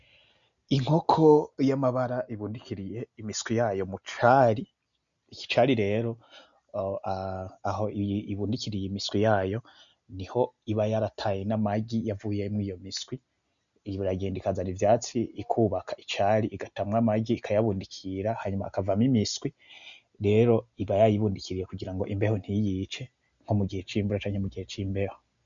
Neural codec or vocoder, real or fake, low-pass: none; real; 7.2 kHz